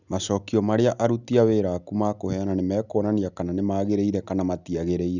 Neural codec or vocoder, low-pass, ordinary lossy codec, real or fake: none; 7.2 kHz; none; real